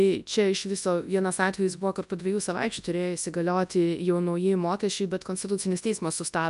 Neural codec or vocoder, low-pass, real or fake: codec, 24 kHz, 0.9 kbps, WavTokenizer, large speech release; 10.8 kHz; fake